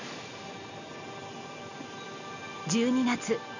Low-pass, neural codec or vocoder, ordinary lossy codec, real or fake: 7.2 kHz; none; none; real